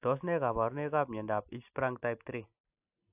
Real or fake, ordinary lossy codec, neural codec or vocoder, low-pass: real; AAC, 32 kbps; none; 3.6 kHz